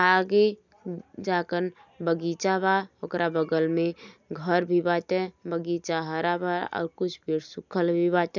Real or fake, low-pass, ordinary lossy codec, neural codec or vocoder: real; 7.2 kHz; none; none